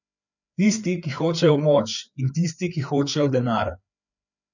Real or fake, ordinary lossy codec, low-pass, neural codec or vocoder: fake; none; 7.2 kHz; codec, 16 kHz, 4 kbps, FreqCodec, larger model